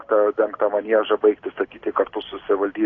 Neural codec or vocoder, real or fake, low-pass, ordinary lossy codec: none; real; 7.2 kHz; AAC, 32 kbps